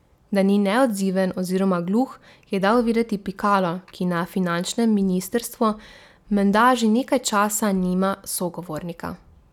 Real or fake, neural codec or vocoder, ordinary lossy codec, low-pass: real; none; none; 19.8 kHz